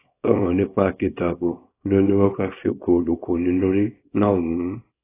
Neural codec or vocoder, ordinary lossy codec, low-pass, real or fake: codec, 24 kHz, 0.9 kbps, WavTokenizer, small release; AAC, 16 kbps; 3.6 kHz; fake